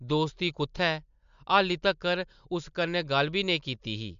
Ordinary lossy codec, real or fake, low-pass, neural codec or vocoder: MP3, 64 kbps; real; 7.2 kHz; none